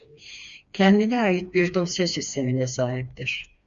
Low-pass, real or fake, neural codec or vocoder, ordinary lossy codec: 7.2 kHz; fake; codec, 16 kHz, 2 kbps, FreqCodec, smaller model; Opus, 64 kbps